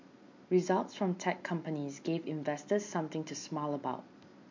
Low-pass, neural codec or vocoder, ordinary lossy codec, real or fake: 7.2 kHz; none; MP3, 48 kbps; real